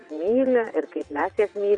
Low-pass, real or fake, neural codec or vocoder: 9.9 kHz; fake; vocoder, 22.05 kHz, 80 mel bands, WaveNeXt